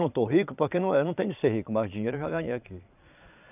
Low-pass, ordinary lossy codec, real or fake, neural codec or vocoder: 3.6 kHz; none; fake; vocoder, 22.05 kHz, 80 mel bands, Vocos